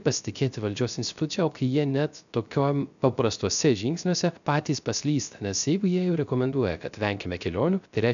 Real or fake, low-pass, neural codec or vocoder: fake; 7.2 kHz; codec, 16 kHz, 0.3 kbps, FocalCodec